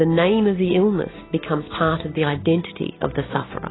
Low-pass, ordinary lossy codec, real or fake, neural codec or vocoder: 7.2 kHz; AAC, 16 kbps; real; none